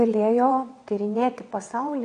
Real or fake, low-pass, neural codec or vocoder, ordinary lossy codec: fake; 9.9 kHz; vocoder, 22.05 kHz, 80 mel bands, WaveNeXt; AAC, 48 kbps